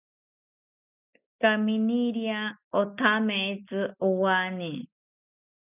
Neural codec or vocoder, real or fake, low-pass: none; real; 3.6 kHz